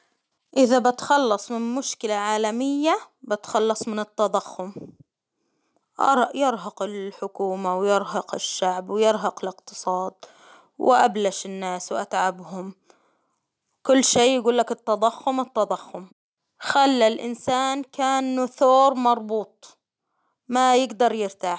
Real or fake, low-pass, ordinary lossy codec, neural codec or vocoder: real; none; none; none